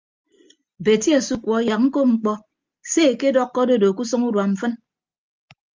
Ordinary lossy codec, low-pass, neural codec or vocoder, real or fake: Opus, 32 kbps; 7.2 kHz; none; real